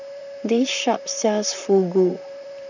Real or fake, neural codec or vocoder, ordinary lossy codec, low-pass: fake; vocoder, 44.1 kHz, 128 mel bands, Pupu-Vocoder; none; 7.2 kHz